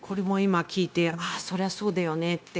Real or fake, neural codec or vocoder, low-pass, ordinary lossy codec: fake; codec, 16 kHz, 0.9 kbps, LongCat-Audio-Codec; none; none